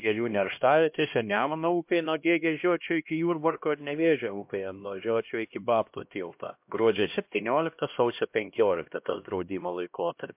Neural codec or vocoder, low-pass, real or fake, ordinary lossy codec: codec, 16 kHz, 1 kbps, X-Codec, HuBERT features, trained on LibriSpeech; 3.6 kHz; fake; MP3, 32 kbps